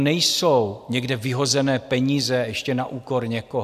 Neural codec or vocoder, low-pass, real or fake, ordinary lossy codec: none; 14.4 kHz; real; AAC, 96 kbps